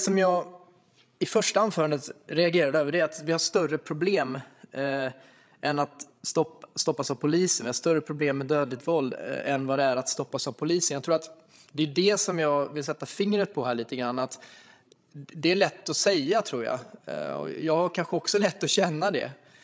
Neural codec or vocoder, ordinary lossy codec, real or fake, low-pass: codec, 16 kHz, 16 kbps, FreqCodec, larger model; none; fake; none